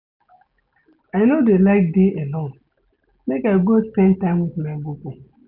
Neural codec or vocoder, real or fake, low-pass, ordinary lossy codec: none; real; 5.4 kHz; none